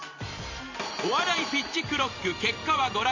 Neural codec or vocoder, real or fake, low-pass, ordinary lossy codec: vocoder, 44.1 kHz, 128 mel bands every 512 samples, BigVGAN v2; fake; 7.2 kHz; none